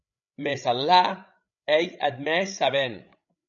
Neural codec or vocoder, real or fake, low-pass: codec, 16 kHz, 16 kbps, FreqCodec, larger model; fake; 7.2 kHz